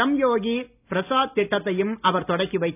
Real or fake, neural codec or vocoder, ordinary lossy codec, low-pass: real; none; none; 3.6 kHz